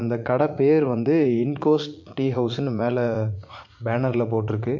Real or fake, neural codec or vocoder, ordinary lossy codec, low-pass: fake; vocoder, 44.1 kHz, 80 mel bands, Vocos; MP3, 48 kbps; 7.2 kHz